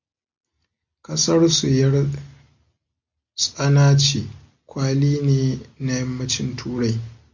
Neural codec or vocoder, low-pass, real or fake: none; 7.2 kHz; real